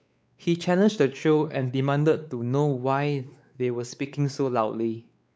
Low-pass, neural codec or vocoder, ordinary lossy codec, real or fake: none; codec, 16 kHz, 4 kbps, X-Codec, WavLM features, trained on Multilingual LibriSpeech; none; fake